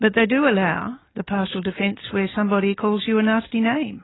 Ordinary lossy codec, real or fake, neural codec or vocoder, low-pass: AAC, 16 kbps; real; none; 7.2 kHz